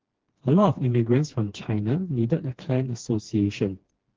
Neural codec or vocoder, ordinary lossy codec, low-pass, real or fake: codec, 16 kHz, 2 kbps, FreqCodec, smaller model; Opus, 16 kbps; 7.2 kHz; fake